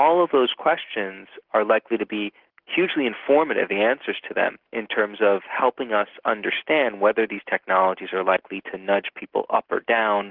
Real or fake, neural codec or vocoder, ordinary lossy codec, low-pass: real; none; Opus, 16 kbps; 5.4 kHz